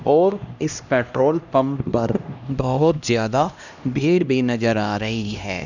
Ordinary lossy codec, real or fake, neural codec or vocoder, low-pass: none; fake; codec, 16 kHz, 1 kbps, X-Codec, HuBERT features, trained on LibriSpeech; 7.2 kHz